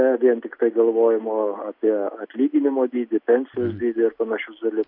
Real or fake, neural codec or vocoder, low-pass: real; none; 5.4 kHz